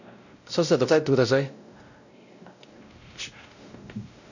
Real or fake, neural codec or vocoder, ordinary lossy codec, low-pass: fake; codec, 16 kHz, 0.5 kbps, X-Codec, WavLM features, trained on Multilingual LibriSpeech; none; 7.2 kHz